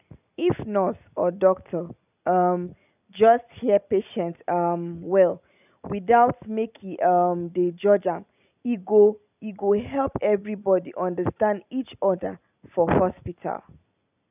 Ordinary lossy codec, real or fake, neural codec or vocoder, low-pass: none; real; none; 3.6 kHz